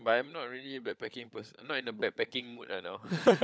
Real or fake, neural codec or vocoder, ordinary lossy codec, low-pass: fake; codec, 16 kHz, 16 kbps, FunCodec, trained on LibriTTS, 50 frames a second; none; none